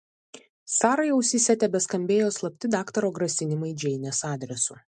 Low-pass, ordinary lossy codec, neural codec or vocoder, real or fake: 10.8 kHz; MP3, 64 kbps; none; real